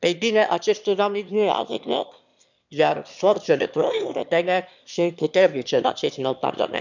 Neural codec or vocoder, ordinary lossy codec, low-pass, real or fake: autoencoder, 22.05 kHz, a latent of 192 numbers a frame, VITS, trained on one speaker; none; 7.2 kHz; fake